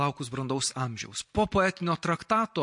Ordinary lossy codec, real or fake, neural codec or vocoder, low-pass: MP3, 48 kbps; real; none; 10.8 kHz